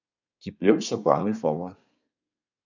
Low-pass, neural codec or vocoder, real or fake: 7.2 kHz; codec, 24 kHz, 1 kbps, SNAC; fake